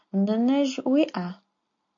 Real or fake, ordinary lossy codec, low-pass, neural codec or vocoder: real; MP3, 48 kbps; 7.2 kHz; none